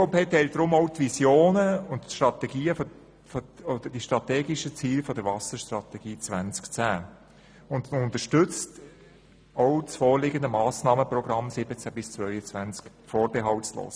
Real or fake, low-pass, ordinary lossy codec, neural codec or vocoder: real; none; none; none